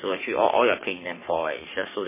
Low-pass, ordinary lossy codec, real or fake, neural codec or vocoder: 3.6 kHz; MP3, 16 kbps; fake; codec, 44.1 kHz, 3.4 kbps, Pupu-Codec